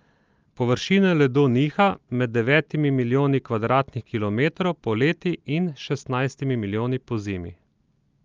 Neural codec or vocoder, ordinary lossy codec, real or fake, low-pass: none; Opus, 32 kbps; real; 7.2 kHz